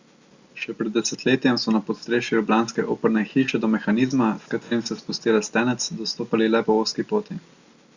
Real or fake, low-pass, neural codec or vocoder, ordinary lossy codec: real; 7.2 kHz; none; Opus, 64 kbps